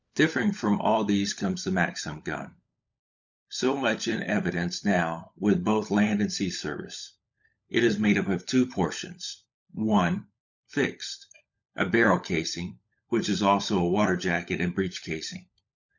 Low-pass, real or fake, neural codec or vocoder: 7.2 kHz; fake; codec, 16 kHz, 16 kbps, FunCodec, trained on LibriTTS, 50 frames a second